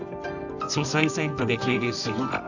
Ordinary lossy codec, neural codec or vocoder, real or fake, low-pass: none; codec, 24 kHz, 0.9 kbps, WavTokenizer, medium music audio release; fake; 7.2 kHz